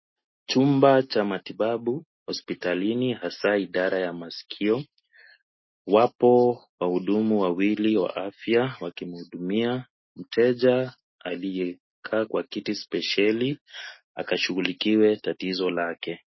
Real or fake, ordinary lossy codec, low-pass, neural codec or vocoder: real; MP3, 24 kbps; 7.2 kHz; none